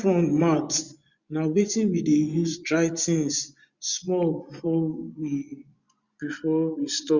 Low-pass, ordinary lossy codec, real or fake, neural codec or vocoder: 7.2 kHz; Opus, 64 kbps; fake; vocoder, 24 kHz, 100 mel bands, Vocos